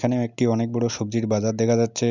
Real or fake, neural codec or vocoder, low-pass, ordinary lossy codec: real; none; 7.2 kHz; none